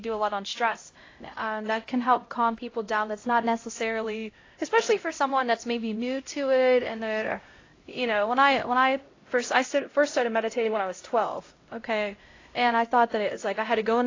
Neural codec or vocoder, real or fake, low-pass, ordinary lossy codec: codec, 16 kHz, 0.5 kbps, X-Codec, HuBERT features, trained on LibriSpeech; fake; 7.2 kHz; AAC, 32 kbps